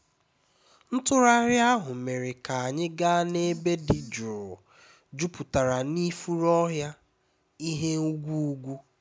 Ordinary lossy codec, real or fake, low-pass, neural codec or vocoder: none; real; none; none